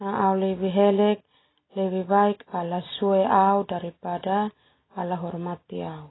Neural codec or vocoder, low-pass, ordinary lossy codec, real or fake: none; 7.2 kHz; AAC, 16 kbps; real